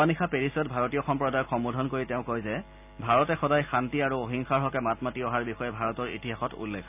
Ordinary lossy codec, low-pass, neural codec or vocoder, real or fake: none; 3.6 kHz; none; real